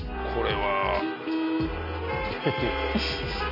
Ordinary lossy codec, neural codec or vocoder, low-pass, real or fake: AAC, 48 kbps; none; 5.4 kHz; real